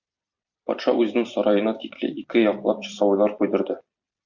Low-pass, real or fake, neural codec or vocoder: 7.2 kHz; fake; vocoder, 44.1 kHz, 128 mel bands every 256 samples, BigVGAN v2